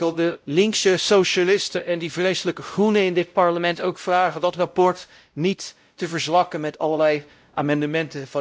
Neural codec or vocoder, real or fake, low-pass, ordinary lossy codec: codec, 16 kHz, 0.5 kbps, X-Codec, WavLM features, trained on Multilingual LibriSpeech; fake; none; none